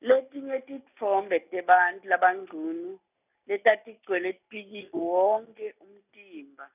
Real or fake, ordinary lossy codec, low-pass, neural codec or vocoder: real; none; 3.6 kHz; none